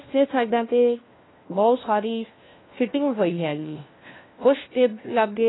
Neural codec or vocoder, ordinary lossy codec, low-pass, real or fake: codec, 16 kHz, 1 kbps, FunCodec, trained on LibriTTS, 50 frames a second; AAC, 16 kbps; 7.2 kHz; fake